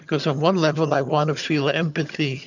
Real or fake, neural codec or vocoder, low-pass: fake; vocoder, 22.05 kHz, 80 mel bands, HiFi-GAN; 7.2 kHz